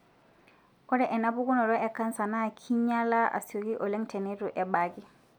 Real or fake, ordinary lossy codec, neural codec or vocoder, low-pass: real; none; none; 19.8 kHz